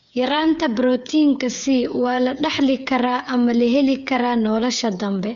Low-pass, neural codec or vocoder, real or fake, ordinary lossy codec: 7.2 kHz; codec, 16 kHz, 8 kbps, FreqCodec, smaller model; fake; none